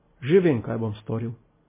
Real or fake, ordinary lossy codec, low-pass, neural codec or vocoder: real; MP3, 16 kbps; 3.6 kHz; none